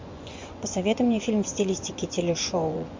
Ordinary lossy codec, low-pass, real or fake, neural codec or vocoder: MP3, 48 kbps; 7.2 kHz; fake; vocoder, 24 kHz, 100 mel bands, Vocos